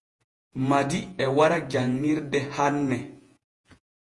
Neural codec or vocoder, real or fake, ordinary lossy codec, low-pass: vocoder, 48 kHz, 128 mel bands, Vocos; fake; Opus, 32 kbps; 10.8 kHz